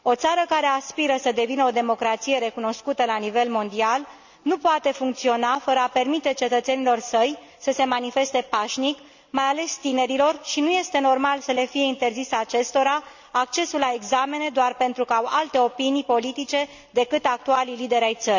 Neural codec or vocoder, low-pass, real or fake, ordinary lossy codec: none; 7.2 kHz; real; none